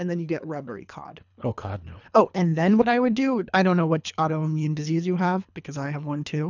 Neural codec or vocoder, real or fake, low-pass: codec, 24 kHz, 3 kbps, HILCodec; fake; 7.2 kHz